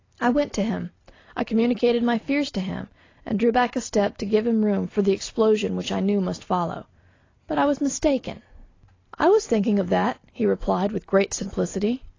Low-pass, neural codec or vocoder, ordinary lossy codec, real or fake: 7.2 kHz; none; AAC, 32 kbps; real